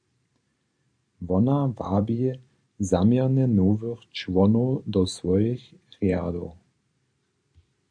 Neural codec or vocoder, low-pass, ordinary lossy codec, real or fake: vocoder, 44.1 kHz, 128 mel bands every 512 samples, BigVGAN v2; 9.9 kHz; AAC, 64 kbps; fake